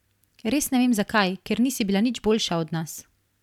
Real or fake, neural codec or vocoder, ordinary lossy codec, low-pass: fake; vocoder, 44.1 kHz, 128 mel bands every 256 samples, BigVGAN v2; none; 19.8 kHz